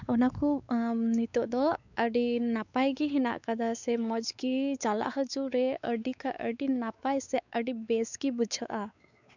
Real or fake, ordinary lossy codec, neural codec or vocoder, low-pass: fake; none; codec, 16 kHz, 4 kbps, X-Codec, WavLM features, trained on Multilingual LibriSpeech; 7.2 kHz